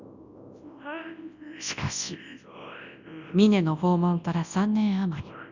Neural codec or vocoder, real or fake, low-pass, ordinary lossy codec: codec, 24 kHz, 0.9 kbps, WavTokenizer, large speech release; fake; 7.2 kHz; none